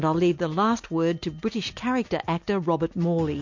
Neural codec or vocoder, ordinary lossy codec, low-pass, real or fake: none; MP3, 48 kbps; 7.2 kHz; real